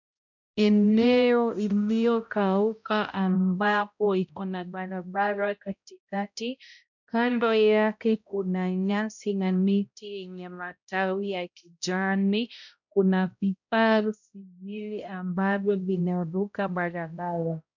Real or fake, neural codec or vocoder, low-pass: fake; codec, 16 kHz, 0.5 kbps, X-Codec, HuBERT features, trained on balanced general audio; 7.2 kHz